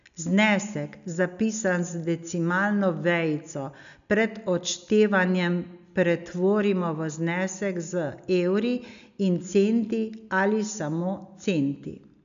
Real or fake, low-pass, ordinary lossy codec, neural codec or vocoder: real; 7.2 kHz; none; none